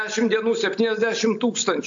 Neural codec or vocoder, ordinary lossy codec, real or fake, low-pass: none; AAC, 64 kbps; real; 7.2 kHz